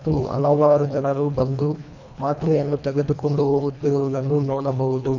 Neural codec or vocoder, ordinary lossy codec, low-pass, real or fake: codec, 24 kHz, 1.5 kbps, HILCodec; none; 7.2 kHz; fake